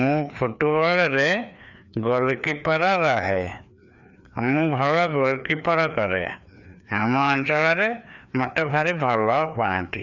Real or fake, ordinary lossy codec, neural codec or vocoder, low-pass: fake; none; codec, 16 kHz, 2 kbps, FreqCodec, larger model; 7.2 kHz